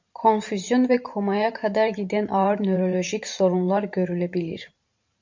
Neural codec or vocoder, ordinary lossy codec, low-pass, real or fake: vocoder, 44.1 kHz, 128 mel bands every 512 samples, BigVGAN v2; MP3, 48 kbps; 7.2 kHz; fake